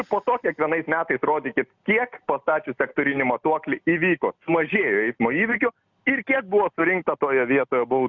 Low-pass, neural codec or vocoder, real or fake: 7.2 kHz; none; real